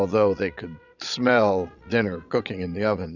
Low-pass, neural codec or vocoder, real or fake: 7.2 kHz; vocoder, 22.05 kHz, 80 mel bands, Vocos; fake